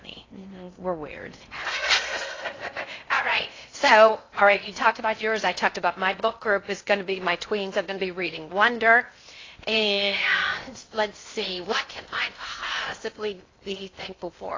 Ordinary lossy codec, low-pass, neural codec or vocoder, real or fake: AAC, 32 kbps; 7.2 kHz; codec, 16 kHz in and 24 kHz out, 0.6 kbps, FocalCodec, streaming, 4096 codes; fake